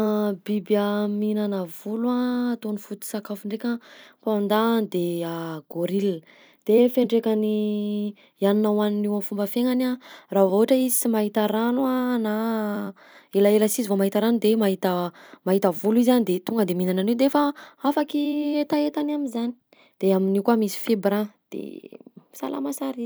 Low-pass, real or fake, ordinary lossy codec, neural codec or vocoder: none; fake; none; vocoder, 44.1 kHz, 128 mel bands every 256 samples, BigVGAN v2